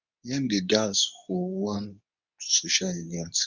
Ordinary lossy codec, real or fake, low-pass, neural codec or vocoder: none; fake; 7.2 kHz; codec, 24 kHz, 0.9 kbps, WavTokenizer, medium speech release version 2